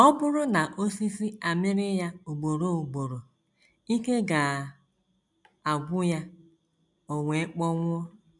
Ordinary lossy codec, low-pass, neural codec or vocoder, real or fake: none; 10.8 kHz; none; real